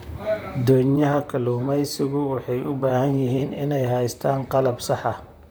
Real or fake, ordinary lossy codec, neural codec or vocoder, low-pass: fake; none; vocoder, 44.1 kHz, 128 mel bands, Pupu-Vocoder; none